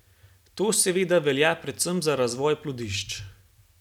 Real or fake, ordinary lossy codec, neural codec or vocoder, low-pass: real; none; none; 19.8 kHz